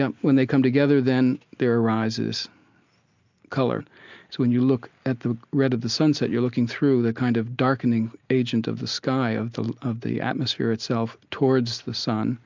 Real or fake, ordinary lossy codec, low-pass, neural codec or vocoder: real; MP3, 64 kbps; 7.2 kHz; none